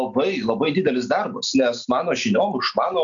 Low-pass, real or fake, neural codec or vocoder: 7.2 kHz; real; none